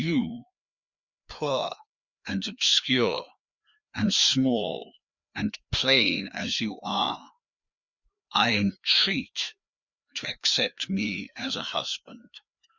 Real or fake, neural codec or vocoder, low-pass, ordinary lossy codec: fake; codec, 16 kHz, 2 kbps, FreqCodec, larger model; 7.2 kHz; Opus, 64 kbps